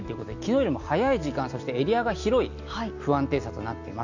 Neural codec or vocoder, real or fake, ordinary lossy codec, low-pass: none; real; none; 7.2 kHz